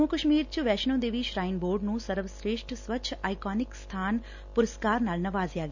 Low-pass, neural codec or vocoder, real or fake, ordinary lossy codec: 7.2 kHz; none; real; none